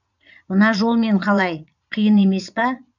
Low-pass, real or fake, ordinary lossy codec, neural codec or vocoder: 7.2 kHz; fake; none; vocoder, 22.05 kHz, 80 mel bands, Vocos